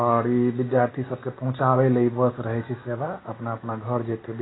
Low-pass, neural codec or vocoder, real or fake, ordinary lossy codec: 7.2 kHz; none; real; AAC, 16 kbps